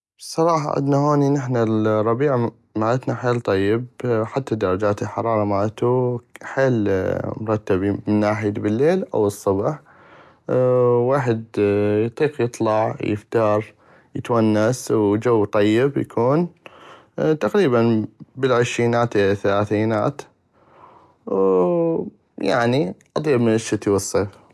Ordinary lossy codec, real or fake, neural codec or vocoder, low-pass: none; real; none; none